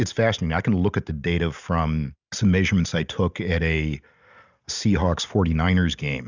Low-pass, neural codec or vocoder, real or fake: 7.2 kHz; none; real